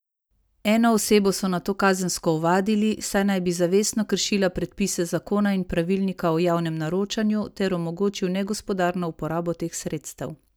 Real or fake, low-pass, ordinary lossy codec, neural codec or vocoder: real; none; none; none